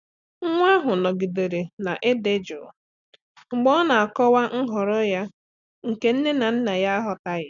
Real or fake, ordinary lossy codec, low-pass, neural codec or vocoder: real; none; 7.2 kHz; none